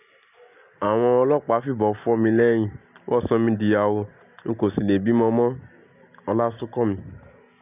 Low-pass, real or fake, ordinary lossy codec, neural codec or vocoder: 3.6 kHz; real; none; none